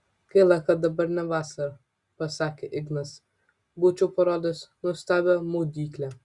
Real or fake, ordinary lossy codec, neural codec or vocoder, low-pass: real; Opus, 64 kbps; none; 10.8 kHz